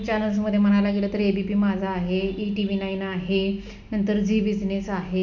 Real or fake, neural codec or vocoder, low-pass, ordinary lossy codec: real; none; 7.2 kHz; none